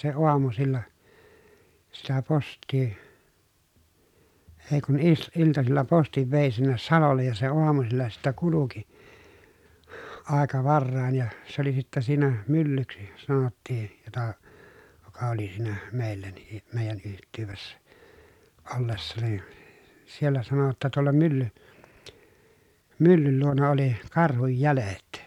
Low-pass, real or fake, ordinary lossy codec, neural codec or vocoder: 19.8 kHz; real; none; none